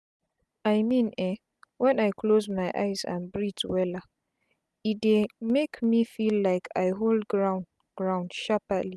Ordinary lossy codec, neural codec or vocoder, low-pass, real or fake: none; none; none; real